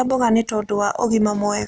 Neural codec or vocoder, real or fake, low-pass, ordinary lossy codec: none; real; none; none